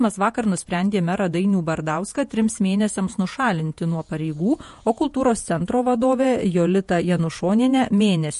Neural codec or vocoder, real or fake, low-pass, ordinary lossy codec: vocoder, 48 kHz, 128 mel bands, Vocos; fake; 14.4 kHz; MP3, 48 kbps